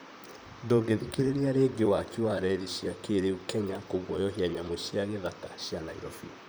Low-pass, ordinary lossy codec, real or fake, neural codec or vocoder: none; none; fake; vocoder, 44.1 kHz, 128 mel bands, Pupu-Vocoder